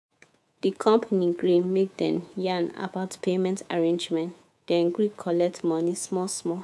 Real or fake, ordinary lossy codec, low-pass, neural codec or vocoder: fake; none; none; codec, 24 kHz, 3.1 kbps, DualCodec